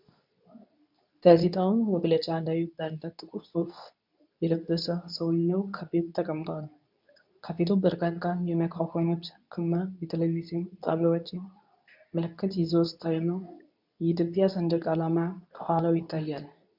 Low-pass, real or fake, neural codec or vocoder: 5.4 kHz; fake; codec, 24 kHz, 0.9 kbps, WavTokenizer, medium speech release version 2